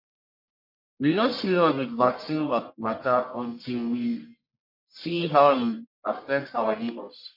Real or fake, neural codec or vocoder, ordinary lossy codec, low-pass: fake; codec, 44.1 kHz, 1.7 kbps, Pupu-Codec; MP3, 32 kbps; 5.4 kHz